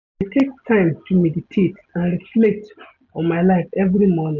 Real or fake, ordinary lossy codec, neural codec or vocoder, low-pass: real; none; none; 7.2 kHz